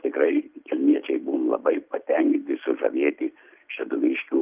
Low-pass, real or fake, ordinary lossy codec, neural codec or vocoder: 3.6 kHz; real; Opus, 24 kbps; none